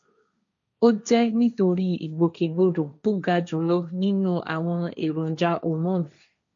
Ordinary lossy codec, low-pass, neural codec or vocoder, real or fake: MP3, 64 kbps; 7.2 kHz; codec, 16 kHz, 1.1 kbps, Voila-Tokenizer; fake